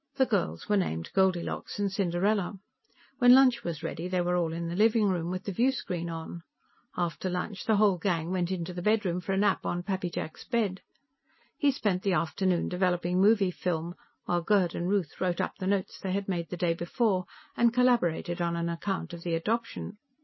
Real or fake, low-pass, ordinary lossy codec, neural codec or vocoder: real; 7.2 kHz; MP3, 24 kbps; none